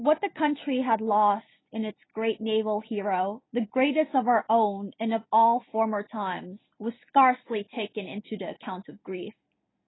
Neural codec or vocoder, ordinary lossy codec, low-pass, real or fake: none; AAC, 16 kbps; 7.2 kHz; real